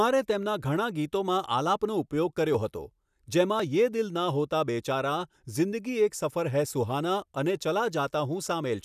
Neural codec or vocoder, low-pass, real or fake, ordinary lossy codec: none; 14.4 kHz; real; none